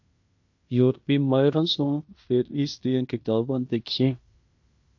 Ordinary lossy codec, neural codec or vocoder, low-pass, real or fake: AAC, 48 kbps; codec, 16 kHz in and 24 kHz out, 0.9 kbps, LongCat-Audio-Codec, fine tuned four codebook decoder; 7.2 kHz; fake